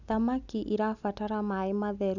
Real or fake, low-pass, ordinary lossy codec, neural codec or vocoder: real; 7.2 kHz; none; none